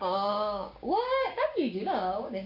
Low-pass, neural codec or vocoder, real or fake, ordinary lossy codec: 5.4 kHz; codec, 16 kHz, 6 kbps, DAC; fake; AAC, 48 kbps